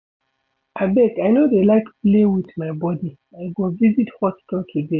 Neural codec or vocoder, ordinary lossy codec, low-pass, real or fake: none; none; 7.2 kHz; real